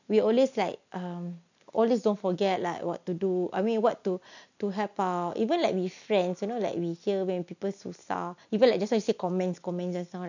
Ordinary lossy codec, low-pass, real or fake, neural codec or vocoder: none; 7.2 kHz; real; none